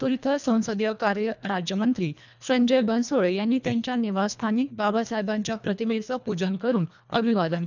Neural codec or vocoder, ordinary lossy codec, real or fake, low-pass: codec, 24 kHz, 1.5 kbps, HILCodec; none; fake; 7.2 kHz